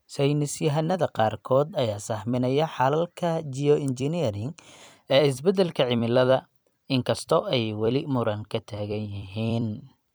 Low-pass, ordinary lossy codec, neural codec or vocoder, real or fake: none; none; vocoder, 44.1 kHz, 128 mel bands every 256 samples, BigVGAN v2; fake